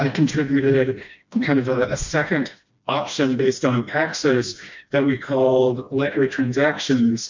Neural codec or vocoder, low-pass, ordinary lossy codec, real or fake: codec, 16 kHz, 1 kbps, FreqCodec, smaller model; 7.2 kHz; MP3, 48 kbps; fake